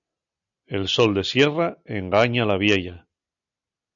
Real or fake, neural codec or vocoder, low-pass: real; none; 7.2 kHz